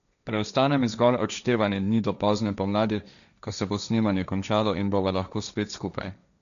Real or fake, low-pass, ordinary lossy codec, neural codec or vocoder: fake; 7.2 kHz; none; codec, 16 kHz, 1.1 kbps, Voila-Tokenizer